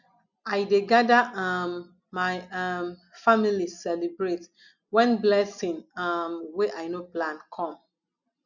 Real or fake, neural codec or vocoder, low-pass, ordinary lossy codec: real; none; 7.2 kHz; none